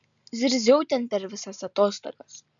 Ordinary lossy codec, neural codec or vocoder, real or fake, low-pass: MP3, 96 kbps; none; real; 7.2 kHz